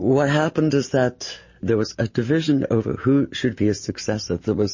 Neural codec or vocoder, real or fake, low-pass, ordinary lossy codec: none; real; 7.2 kHz; MP3, 32 kbps